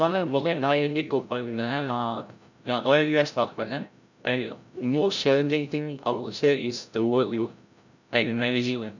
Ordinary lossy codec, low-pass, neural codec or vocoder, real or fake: none; 7.2 kHz; codec, 16 kHz, 0.5 kbps, FreqCodec, larger model; fake